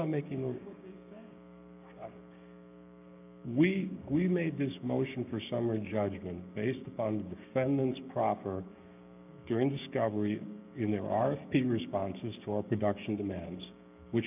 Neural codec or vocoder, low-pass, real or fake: codec, 16 kHz, 6 kbps, DAC; 3.6 kHz; fake